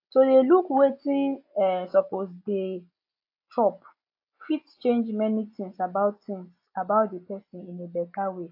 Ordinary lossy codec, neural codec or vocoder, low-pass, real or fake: AAC, 32 kbps; none; 5.4 kHz; real